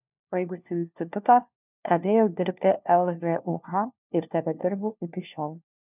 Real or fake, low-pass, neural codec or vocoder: fake; 3.6 kHz; codec, 16 kHz, 1 kbps, FunCodec, trained on LibriTTS, 50 frames a second